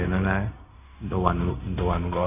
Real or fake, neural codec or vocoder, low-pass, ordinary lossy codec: fake; codec, 16 kHz, 0.4 kbps, LongCat-Audio-Codec; 3.6 kHz; none